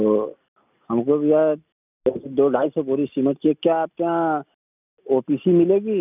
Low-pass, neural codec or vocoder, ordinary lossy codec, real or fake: 3.6 kHz; none; none; real